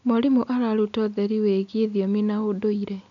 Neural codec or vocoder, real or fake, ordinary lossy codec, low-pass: none; real; none; 7.2 kHz